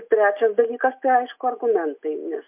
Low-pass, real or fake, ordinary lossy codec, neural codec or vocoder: 3.6 kHz; real; MP3, 32 kbps; none